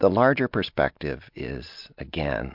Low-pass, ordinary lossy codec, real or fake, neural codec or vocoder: 5.4 kHz; MP3, 48 kbps; real; none